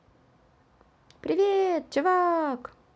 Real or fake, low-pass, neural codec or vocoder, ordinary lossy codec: real; none; none; none